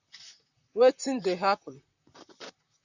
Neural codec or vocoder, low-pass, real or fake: vocoder, 44.1 kHz, 128 mel bands, Pupu-Vocoder; 7.2 kHz; fake